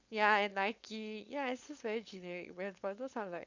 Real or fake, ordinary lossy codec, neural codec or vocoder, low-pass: fake; Opus, 64 kbps; codec, 16 kHz, 4.8 kbps, FACodec; 7.2 kHz